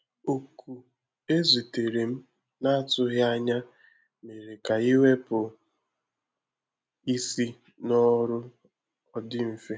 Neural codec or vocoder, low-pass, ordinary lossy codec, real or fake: none; none; none; real